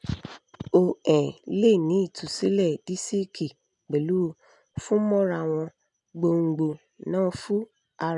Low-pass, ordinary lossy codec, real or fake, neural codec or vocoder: 10.8 kHz; none; real; none